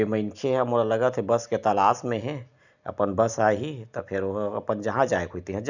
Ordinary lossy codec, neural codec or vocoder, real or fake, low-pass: none; none; real; 7.2 kHz